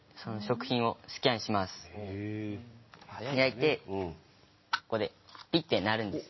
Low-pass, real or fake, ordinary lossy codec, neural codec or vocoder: 7.2 kHz; real; MP3, 24 kbps; none